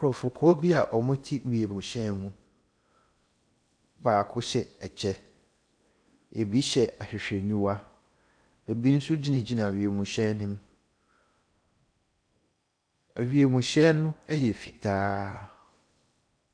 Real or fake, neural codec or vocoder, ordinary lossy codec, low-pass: fake; codec, 16 kHz in and 24 kHz out, 0.8 kbps, FocalCodec, streaming, 65536 codes; AAC, 64 kbps; 9.9 kHz